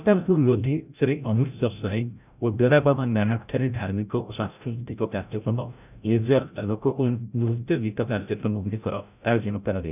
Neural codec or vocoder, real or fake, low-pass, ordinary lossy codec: codec, 16 kHz, 0.5 kbps, FreqCodec, larger model; fake; 3.6 kHz; none